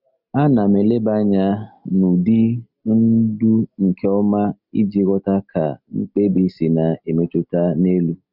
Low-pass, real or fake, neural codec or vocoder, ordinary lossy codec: 5.4 kHz; real; none; none